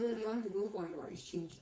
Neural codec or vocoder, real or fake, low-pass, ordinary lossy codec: codec, 16 kHz, 4.8 kbps, FACodec; fake; none; none